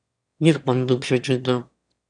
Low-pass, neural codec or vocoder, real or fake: 9.9 kHz; autoencoder, 22.05 kHz, a latent of 192 numbers a frame, VITS, trained on one speaker; fake